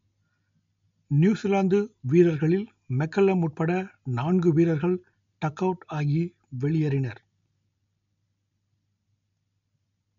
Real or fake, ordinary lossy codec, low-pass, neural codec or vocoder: real; MP3, 48 kbps; 7.2 kHz; none